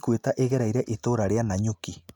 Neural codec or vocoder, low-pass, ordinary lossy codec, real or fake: none; 19.8 kHz; none; real